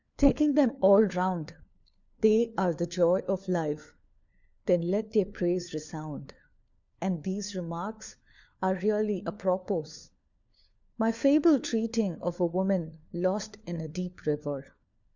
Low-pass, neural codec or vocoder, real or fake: 7.2 kHz; codec, 16 kHz, 4 kbps, FunCodec, trained on LibriTTS, 50 frames a second; fake